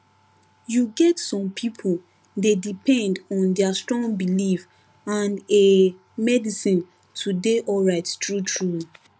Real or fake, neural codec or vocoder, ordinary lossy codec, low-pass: real; none; none; none